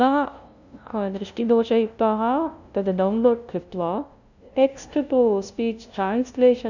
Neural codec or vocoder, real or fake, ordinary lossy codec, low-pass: codec, 16 kHz, 0.5 kbps, FunCodec, trained on LibriTTS, 25 frames a second; fake; none; 7.2 kHz